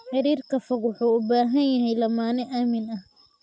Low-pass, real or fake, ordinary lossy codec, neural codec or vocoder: none; real; none; none